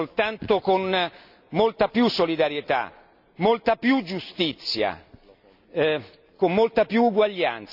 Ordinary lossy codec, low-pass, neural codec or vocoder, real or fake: none; 5.4 kHz; none; real